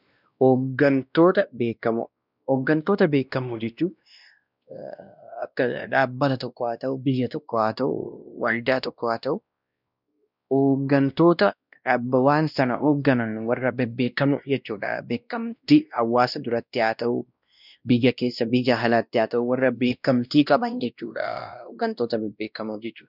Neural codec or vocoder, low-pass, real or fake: codec, 16 kHz, 1 kbps, X-Codec, WavLM features, trained on Multilingual LibriSpeech; 5.4 kHz; fake